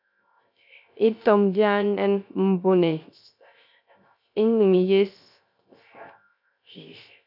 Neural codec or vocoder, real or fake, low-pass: codec, 16 kHz, 0.3 kbps, FocalCodec; fake; 5.4 kHz